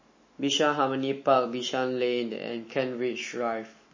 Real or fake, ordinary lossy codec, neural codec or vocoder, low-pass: fake; MP3, 32 kbps; autoencoder, 48 kHz, 128 numbers a frame, DAC-VAE, trained on Japanese speech; 7.2 kHz